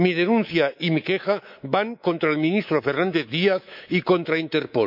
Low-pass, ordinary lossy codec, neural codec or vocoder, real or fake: 5.4 kHz; none; codec, 24 kHz, 3.1 kbps, DualCodec; fake